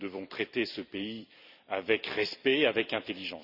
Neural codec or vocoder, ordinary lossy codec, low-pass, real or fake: vocoder, 44.1 kHz, 128 mel bands every 256 samples, BigVGAN v2; none; 5.4 kHz; fake